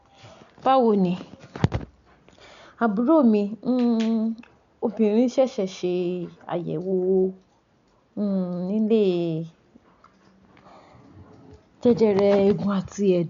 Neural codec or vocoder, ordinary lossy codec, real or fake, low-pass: none; none; real; 7.2 kHz